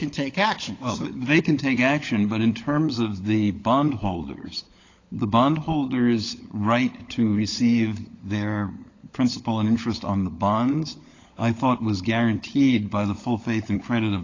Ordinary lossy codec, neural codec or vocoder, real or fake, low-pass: AAC, 32 kbps; codec, 16 kHz, 16 kbps, FunCodec, trained on LibriTTS, 50 frames a second; fake; 7.2 kHz